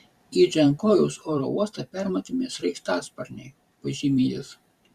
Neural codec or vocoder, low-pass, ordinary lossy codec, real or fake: vocoder, 44.1 kHz, 128 mel bands every 512 samples, BigVGAN v2; 14.4 kHz; Opus, 64 kbps; fake